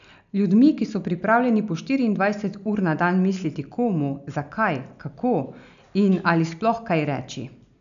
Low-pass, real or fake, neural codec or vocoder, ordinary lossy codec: 7.2 kHz; real; none; none